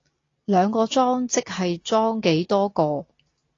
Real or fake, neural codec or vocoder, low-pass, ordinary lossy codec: real; none; 7.2 kHz; AAC, 32 kbps